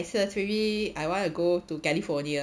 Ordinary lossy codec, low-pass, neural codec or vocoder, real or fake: none; none; none; real